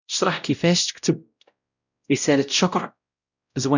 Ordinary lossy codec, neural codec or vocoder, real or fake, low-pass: none; codec, 16 kHz, 0.5 kbps, X-Codec, WavLM features, trained on Multilingual LibriSpeech; fake; 7.2 kHz